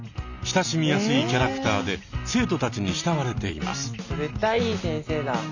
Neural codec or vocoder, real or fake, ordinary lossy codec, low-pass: none; real; none; 7.2 kHz